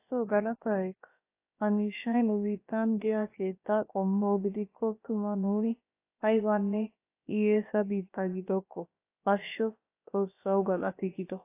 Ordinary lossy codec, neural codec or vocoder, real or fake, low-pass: AAC, 24 kbps; codec, 16 kHz, about 1 kbps, DyCAST, with the encoder's durations; fake; 3.6 kHz